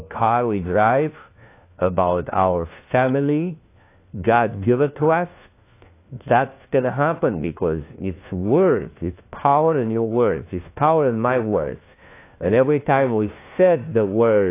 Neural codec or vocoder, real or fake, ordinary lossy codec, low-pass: codec, 16 kHz, 1 kbps, FunCodec, trained on LibriTTS, 50 frames a second; fake; AAC, 24 kbps; 3.6 kHz